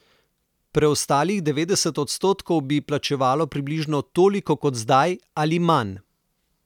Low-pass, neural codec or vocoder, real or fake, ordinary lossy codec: 19.8 kHz; none; real; none